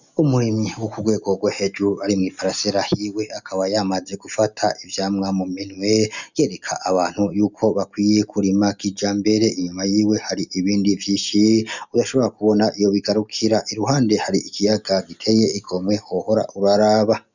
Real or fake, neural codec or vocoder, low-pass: real; none; 7.2 kHz